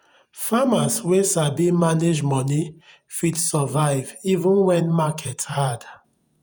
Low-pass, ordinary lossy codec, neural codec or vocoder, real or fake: none; none; vocoder, 48 kHz, 128 mel bands, Vocos; fake